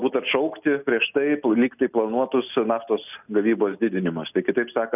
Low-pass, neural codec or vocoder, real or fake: 3.6 kHz; none; real